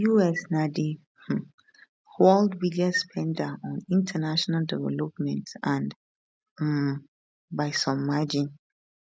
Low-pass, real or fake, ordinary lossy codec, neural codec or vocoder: none; real; none; none